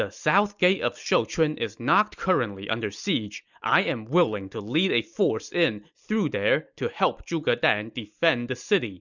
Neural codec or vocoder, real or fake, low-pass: none; real; 7.2 kHz